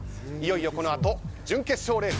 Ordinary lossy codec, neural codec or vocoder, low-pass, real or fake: none; none; none; real